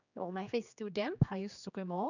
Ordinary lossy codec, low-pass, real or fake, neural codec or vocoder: none; 7.2 kHz; fake; codec, 16 kHz, 2 kbps, X-Codec, HuBERT features, trained on general audio